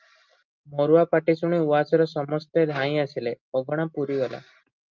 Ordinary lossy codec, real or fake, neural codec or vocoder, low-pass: Opus, 24 kbps; real; none; 7.2 kHz